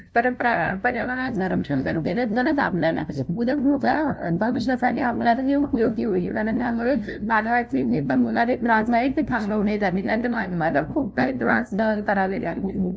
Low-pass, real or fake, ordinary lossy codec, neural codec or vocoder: none; fake; none; codec, 16 kHz, 0.5 kbps, FunCodec, trained on LibriTTS, 25 frames a second